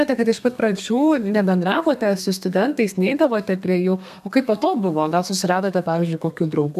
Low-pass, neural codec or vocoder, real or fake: 14.4 kHz; codec, 32 kHz, 1.9 kbps, SNAC; fake